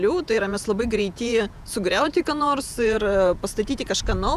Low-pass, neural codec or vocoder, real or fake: 14.4 kHz; vocoder, 48 kHz, 128 mel bands, Vocos; fake